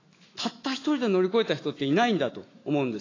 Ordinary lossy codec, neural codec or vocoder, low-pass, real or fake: AAC, 32 kbps; autoencoder, 48 kHz, 128 numbers a frame, DAC-VAE, trained on Japanese speech; 7.2 kHz; fake